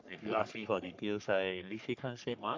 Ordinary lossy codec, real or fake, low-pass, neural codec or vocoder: MP3, 64 kbps; fake; 7.2 kHz; codec, 44.1 kHz, 3.4 kbps, Pupu-Codec